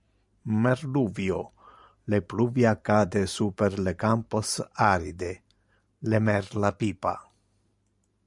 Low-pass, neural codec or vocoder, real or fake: 10.8 kHz; vocoder, 44.1 kHz, 128 mel bands every 512 samples, BigVGAN v2; fake